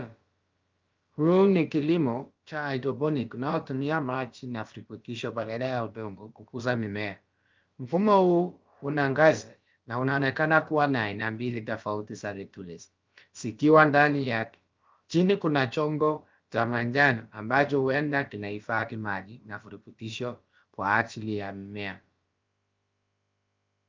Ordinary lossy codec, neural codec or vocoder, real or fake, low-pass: Opus, 24 kbps; codec, 16 kHz, about 1 kbps, DyCAST, with the encoder's durations; fake; 7.2 kHz